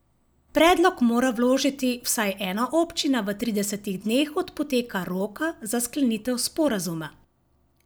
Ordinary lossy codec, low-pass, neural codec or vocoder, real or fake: none; none; none; real